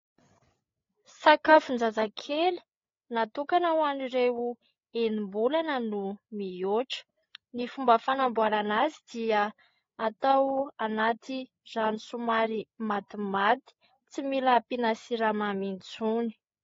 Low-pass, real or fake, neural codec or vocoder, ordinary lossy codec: 7.2 kHz; fake; codec, 16 kHz, 8 kbps, FreqCodec, larger model; AAC, 32 kbps